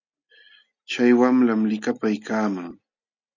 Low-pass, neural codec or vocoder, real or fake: 7.2 kHz; none; real